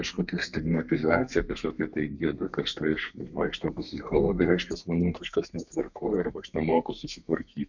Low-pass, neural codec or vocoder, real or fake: 7.2 kHz; codec, 32 kHz, 1.9 kbps, SNAC; fake